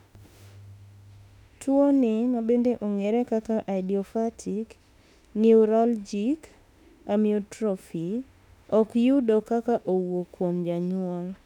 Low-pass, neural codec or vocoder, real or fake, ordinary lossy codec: 19.8 kHz; autoencoder, 48 kHz, 32 numbers a frame, DAC-VAE, trained on Japanese speech; fake; none